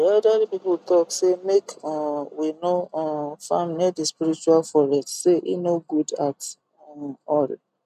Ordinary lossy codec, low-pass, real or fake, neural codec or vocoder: none; 14.4 kHz; real; none